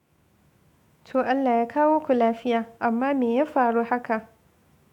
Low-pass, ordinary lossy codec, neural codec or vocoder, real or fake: 19.8 kHz; MP3, 96 kbps; autoencoder, 48 kHz, 128 numbers a frame, DAC-VAE, trained on Japanese speech; fake